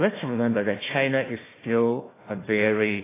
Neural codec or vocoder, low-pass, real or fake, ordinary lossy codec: codec, 16 kHz, 1 kbps, FunCodec, trained on Chinese and English, 50 frames a second; 3.6 kHz; fake; AAC, 16 kbps